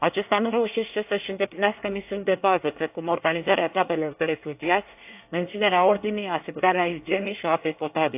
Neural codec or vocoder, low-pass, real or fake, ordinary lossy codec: codec, 24 kHz, 1 kbps, SNAC; 3.6 kHz; fake; AAC, 32 kbps